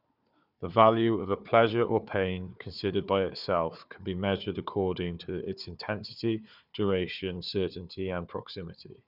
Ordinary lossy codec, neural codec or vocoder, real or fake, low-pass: none; codec, 16 kHz, 4 kbps, FunCodec, trained on Chinese and English, 50 frames a second; fake; 5.4 kHz